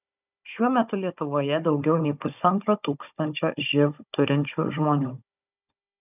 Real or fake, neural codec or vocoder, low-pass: fake; codec, 16 kHz, 16 kbps, FunCodec, trained on Chinese and English, 50 frames a second; 3.6 kHz